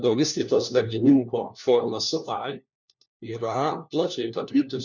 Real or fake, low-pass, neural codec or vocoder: fake; 7.2 kHz; codec, 16 kHz, 1 kbps, FunCodec, trained on LibriTTS, 50 frames a second